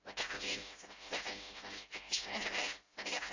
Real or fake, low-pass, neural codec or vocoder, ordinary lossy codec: fake; 7.2 kHz; codec, 16 kHz, 0.5 kbps, FreqCodec, smaller model; AAC, 48 kbps